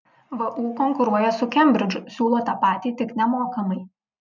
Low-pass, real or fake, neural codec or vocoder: 7.2 kHz; real; none